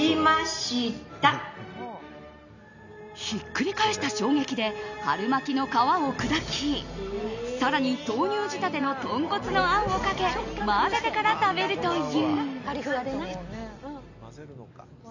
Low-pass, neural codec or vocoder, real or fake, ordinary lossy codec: 7.2 kHz; none; real; none